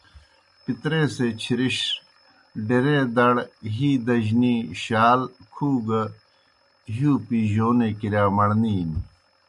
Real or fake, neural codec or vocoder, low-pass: real; none; 10.8 kHz